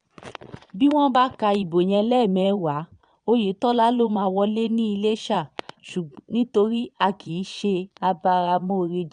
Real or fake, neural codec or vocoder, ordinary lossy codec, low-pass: fake; vocoder, 22.05 kHz, 80 mel bands, Vocos; none; 9.9 kHz